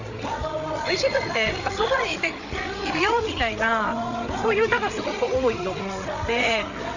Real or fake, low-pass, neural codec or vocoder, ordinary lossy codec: fake; 7.2 kHz; codec, 16 kHz, 8 kbps, FreqCodec, larger model; none